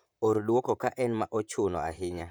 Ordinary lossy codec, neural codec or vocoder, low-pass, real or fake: none; vocoder, 44.1 kHz, 128 mel bands, Pupu-Vocoder; none; fake